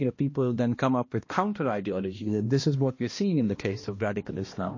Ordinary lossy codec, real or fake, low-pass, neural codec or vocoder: MP3, 32 kbps; fake; 7.2 kHz; codec, 16 kHz, 1 kbps, X-Codec, HuBERT features, trained on balanced general audio